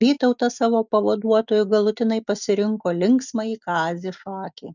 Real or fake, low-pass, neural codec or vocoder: real; 7.2 kHz; none